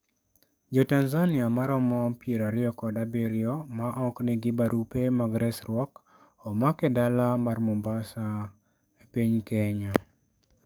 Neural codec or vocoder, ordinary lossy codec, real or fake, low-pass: codec, 44.1 kHz, 7.8 kbps, DAC; none; fake; none